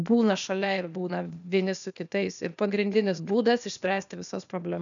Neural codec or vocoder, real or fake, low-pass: codec, 16 kHz, 0.8 kbps, ZipCodec; fake; 7.2 kHz